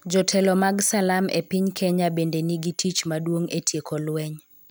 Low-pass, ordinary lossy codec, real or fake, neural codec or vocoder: none; none; real; none